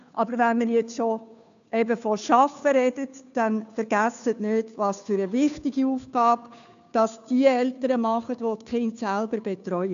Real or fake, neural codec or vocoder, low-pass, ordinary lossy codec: fake; codec, 16 kHz, 2 kbps, FunCodec, trained on Chinese and English, 25 frames a second; 7.2 kHz; none